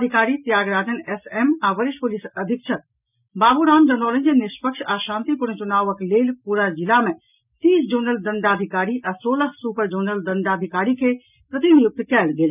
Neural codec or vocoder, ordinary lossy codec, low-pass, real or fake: none; none; 3.6 kHz; real